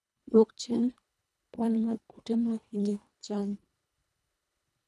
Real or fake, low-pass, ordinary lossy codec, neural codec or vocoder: fake; none; none; codec, 24 kHz, 1.5 kbps, HILCodec